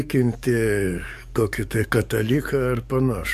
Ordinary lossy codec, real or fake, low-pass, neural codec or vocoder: AAC, 96 kbps; fake; 14.4 kHz; codec, 44.1 kHz, 7.8 kbps, DAC